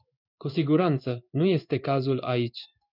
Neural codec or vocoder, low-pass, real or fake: codec, 16 kHz in and 24 kHz out, 1 kbps, XY-Tokenizer; 5.4 kHz; fake